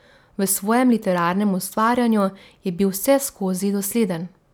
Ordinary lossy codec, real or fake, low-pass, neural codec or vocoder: none; real; none; none